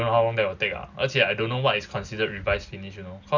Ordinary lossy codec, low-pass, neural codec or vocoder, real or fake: none; 7.2 kHz; none; real